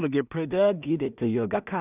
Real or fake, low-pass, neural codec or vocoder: fake; 3.6 kHz; codec, 16 kHz in and 24 kHz out, 0.4 kbps, LongCat-Audio-Codec, two codebook decoder